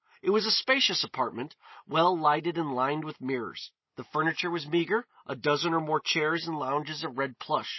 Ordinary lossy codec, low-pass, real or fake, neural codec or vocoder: MP3, 24 kbps; 7.2 kHz; real; none